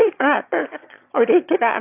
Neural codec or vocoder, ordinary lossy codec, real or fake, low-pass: autoencoder, 22.05 kHz, a latent of 192 numbers a frame, VITS, trained on one speaker; AAC, 32 kbps; fake; 3.6 kHz